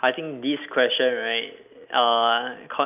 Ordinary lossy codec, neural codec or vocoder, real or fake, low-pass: none; none; real; 3.6 kHz